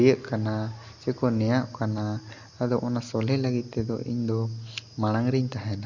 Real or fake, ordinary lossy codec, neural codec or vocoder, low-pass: real; Opus, 64 kbps; none; 7.2 kHz